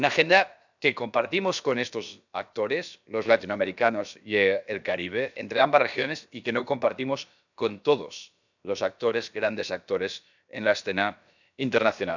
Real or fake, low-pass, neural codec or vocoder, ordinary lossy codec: fake; 7.2 kHz; codec, 16 kHz, about 1 kbps, DyCAST, with the encoder's durations; none